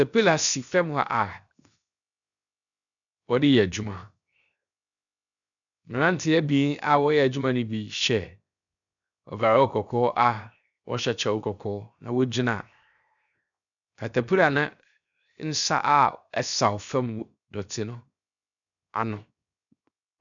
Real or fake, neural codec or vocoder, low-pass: fake; codec, 16 kHz, 0.7 kbps, FocalCodec; 7.2 kHz